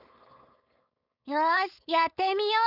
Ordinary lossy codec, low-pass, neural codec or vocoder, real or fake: none; 5.4 kHz; codec, 16 kHz, 4.8 kbps, FACodec; fake